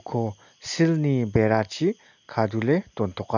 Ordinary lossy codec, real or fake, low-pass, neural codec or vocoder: none; real; 7.2 kHz; none